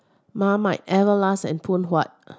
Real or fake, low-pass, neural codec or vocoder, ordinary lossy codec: real; none; none; none